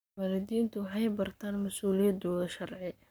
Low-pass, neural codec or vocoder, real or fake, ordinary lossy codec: none; codec, 44.1 kHz, 7.8 kbps, Pupu-Codec; fake; none